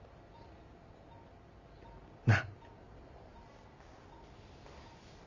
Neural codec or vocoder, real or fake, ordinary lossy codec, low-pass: none; real; Opus, 64 kbps; 7.2 kHz